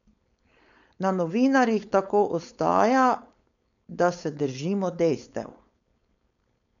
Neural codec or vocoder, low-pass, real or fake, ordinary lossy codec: codec, 16 kHz, 4.8 kbps, FACodec; 7.2 kHz; fake; none